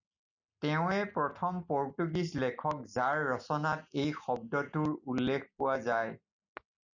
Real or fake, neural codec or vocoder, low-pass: real; none; 7.2 kHz